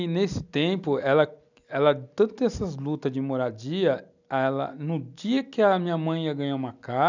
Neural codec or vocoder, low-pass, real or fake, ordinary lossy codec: none; 7.2 kHz; real; none